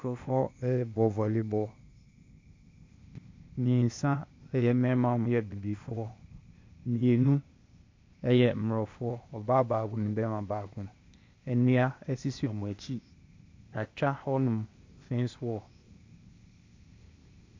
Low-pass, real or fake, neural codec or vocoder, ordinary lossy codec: 7.2 kHz; fake; codec, 16 kHz, 0.8 kbps, ZipCodec; MP3, 48 kbps